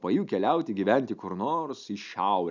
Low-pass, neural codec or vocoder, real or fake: 7.2 kHz; none; real